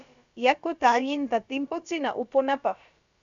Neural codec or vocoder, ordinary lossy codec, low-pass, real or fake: codec, 16 kHz, about 1 kbps, DyCAST, with the encoder's durations; MP3, 96 kbps; 7.2 kHz; fake